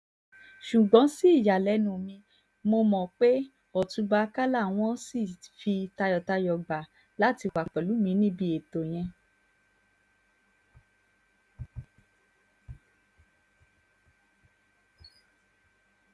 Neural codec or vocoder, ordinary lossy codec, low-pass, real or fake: none; none; none; real